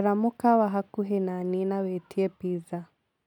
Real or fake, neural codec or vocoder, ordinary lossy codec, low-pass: real; none; none; 19.8 kHz